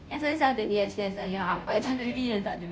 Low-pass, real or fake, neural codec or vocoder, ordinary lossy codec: none; fake; codec, 16 kHz, 0.5 kbps, FunCodec, trained on Chinese and English, 25 frames a second; none